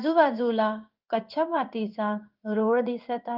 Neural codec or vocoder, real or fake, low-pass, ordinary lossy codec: codec, 16 kHz in and 24 kHz out, 1 kbps, XY-Tokenizer; fake; 5.4 kHz; Opus, 24 kbps